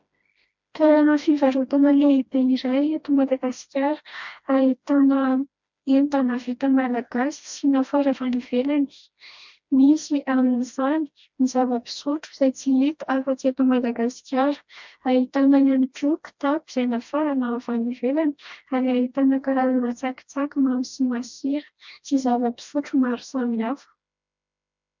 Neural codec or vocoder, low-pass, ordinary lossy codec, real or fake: codec, 16 kHz, 1 kbps, FreqCodec, smaller model; 7.2 kHz; MP3, 64 kbps; fake